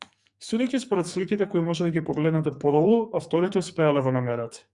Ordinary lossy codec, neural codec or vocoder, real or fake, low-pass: Opus, 64 kbps; codec, 44.1 kHz, 2.6 kbps, SNAC; fake; 10.8 kHz